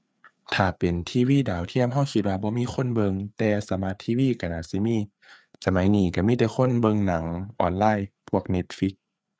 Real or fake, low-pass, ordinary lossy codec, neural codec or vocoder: fake; none; none; codec, 16 kHz, 4 kbps, FreqCodec, larger model